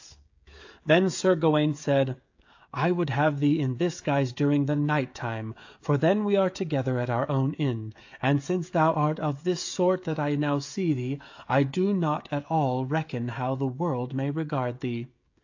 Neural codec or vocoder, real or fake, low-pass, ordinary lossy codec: codec, 16 kHz, 16 kbps, FreqCodec, smaller model; fake; 7.2 kHz; AAC, 48 kbps